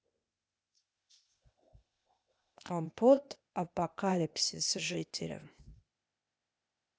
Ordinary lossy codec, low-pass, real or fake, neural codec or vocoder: none; none; fake; codec, 16 kHz, 0.8 kbps, ZipCodec